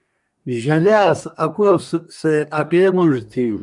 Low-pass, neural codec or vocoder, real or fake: 10.8 kHz; codec, 24 kHz, 1 kbps, SNAC; fake